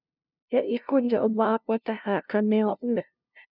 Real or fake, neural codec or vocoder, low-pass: fake; codec, 16 kHz, 0.5 kbps, FunCodec, trained on LibriTTS, 25 frames a second; 5.4 kHz